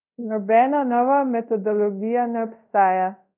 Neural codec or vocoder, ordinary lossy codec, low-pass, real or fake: codec, 24 kHz, 0.5 kbps, DualCodec; none; 3.6 kHz; fake